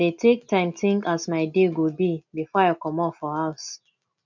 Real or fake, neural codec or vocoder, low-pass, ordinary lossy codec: real; none; 7.2 kHz; none